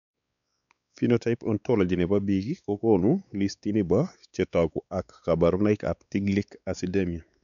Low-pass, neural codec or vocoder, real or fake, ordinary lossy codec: 7.2 kHz; codec, 16 kHz, 4 kbps, X-Codec, WavLM features, trained on Multilingual LibriSpeech; fake; none